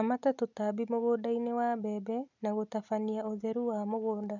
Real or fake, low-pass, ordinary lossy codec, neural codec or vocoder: real; 7.2 kHz; none; none